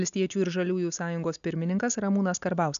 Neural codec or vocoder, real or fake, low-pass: none; real; 7.2 kHz